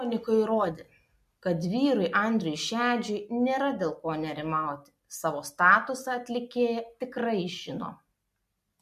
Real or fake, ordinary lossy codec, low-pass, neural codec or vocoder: real; MP3, 64 kbps; 14.4 kHz; none